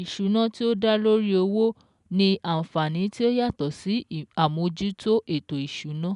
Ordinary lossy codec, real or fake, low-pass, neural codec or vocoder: none; real; 10.8 kHz; none